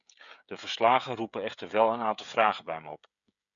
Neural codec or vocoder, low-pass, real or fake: codec, 16 kHz, 16 kbps, FreqCodec, smaller model; 7.2 kHz; fake